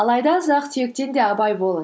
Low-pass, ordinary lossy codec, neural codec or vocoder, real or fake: none; none; none; real